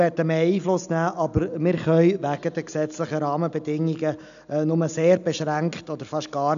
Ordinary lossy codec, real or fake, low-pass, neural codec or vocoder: none; real; 7.2 kHz; none